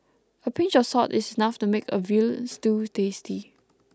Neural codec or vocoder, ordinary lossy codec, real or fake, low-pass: none; none; real; none